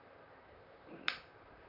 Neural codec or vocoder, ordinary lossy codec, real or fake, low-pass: vocoder, 44.1 kHz, 128 mel bands, Pupu-Vocoder; none; fake; 5.4 kHz